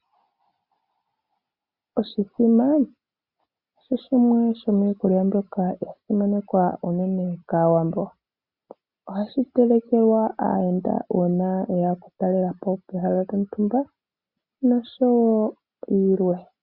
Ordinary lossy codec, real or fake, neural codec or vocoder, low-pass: Opus, 64 kbps; real; none; 5.4 kHz